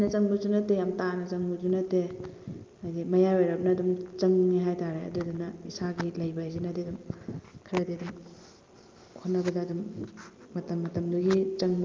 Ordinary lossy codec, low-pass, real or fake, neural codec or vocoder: Opus, 32 kbps; 7.2 kHz; real; none